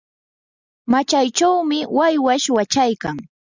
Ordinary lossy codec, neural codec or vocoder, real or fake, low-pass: Opus, 64 kbps; none; real; 7.2 kHz